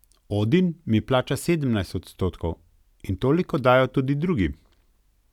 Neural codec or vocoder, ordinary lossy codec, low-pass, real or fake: none; none; 19.8 kHz; real